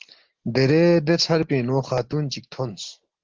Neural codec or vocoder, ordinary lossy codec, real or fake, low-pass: none; Opus, 16 kbps; real; 7.2 kHz